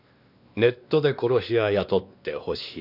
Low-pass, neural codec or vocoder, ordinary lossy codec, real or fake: 5.4 kHz; codec, 16 kHz, 2 kbps, X-Codec, WavLM features, trained on Multilingual LibriSpeech; none; fake